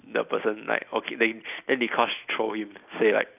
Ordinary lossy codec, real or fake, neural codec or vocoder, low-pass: none; real; none; 3.6 kHz